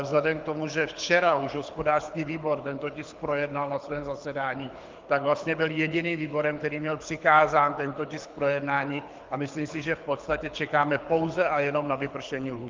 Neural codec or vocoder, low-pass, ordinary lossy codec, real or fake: codec, 24 kHz, 6 kbps, HILCodec; 7.2 kHz; Opus, 24 kbps; fake